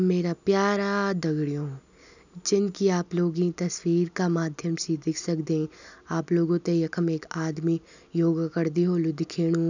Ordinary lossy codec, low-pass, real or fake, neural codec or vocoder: none; 7.2 kHz; real; none